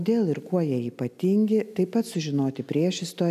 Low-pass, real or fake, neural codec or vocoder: 14.4 kHz; real; none